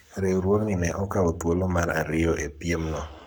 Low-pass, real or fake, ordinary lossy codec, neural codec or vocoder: 19.8 kHz; fake; none; codec, 44.1 kHz, 7.8 kbps, Pupu-Codec